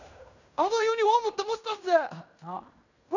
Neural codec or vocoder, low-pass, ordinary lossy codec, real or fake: codec, 16 kHz in and 24 kHz out, 0.9 kbps, LongCat-Audio-Codec, fine tuned four codebook decoder; 7.2 kHz; none; fake